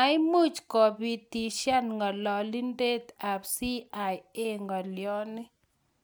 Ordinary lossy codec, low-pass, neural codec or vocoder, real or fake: none; none; none; real